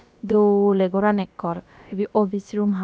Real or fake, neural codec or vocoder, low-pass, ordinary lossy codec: fake; codec, 16 kHz, about 1 kbps, DyCAST, with the encoder's durations; none; none